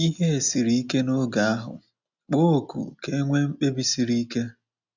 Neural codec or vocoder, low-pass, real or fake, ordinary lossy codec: none; 7.2 kHz; real; none